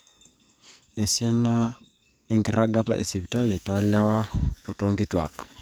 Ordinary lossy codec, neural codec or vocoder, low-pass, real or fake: none; codec, 44.1 kHz, 2.6 kbps, SNAC; none; fake